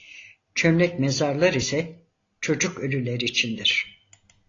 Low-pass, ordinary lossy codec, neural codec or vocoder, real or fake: 7.2 kHz; AAC, 32 kbps; none; real